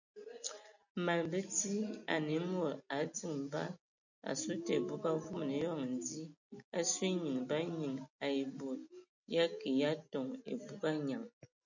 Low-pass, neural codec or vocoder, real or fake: 7.2 kHz; none; real